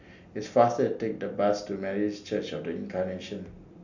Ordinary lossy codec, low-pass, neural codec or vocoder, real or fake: none; 7.2 kHz; none; real